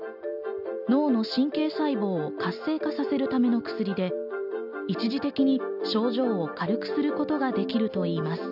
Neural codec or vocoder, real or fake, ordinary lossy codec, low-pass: none; real; none; 5.4 kHz